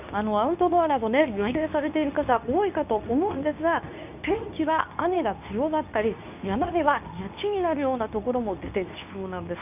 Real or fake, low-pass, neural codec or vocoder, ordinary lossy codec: fake; 3.6 kHz; codec, 24 kHz, 0.9 kbps, WavTokenizer, medium speech release version 2; none